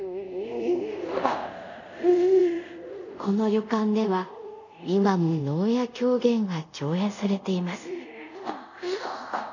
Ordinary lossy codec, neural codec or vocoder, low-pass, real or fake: none; codec, 24 kHz, 0.5 kbps, DualCodec; 7.2 kHz; fake